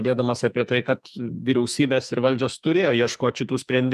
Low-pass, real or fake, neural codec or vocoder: 14.4 kHz; fake; codec, 44.1 kHz, 2.6 kbps, SNAC